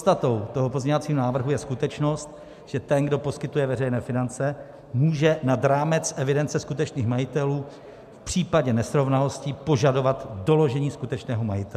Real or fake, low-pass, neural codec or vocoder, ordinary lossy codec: real; 14.4 kHz; none; MP3, 96 kbps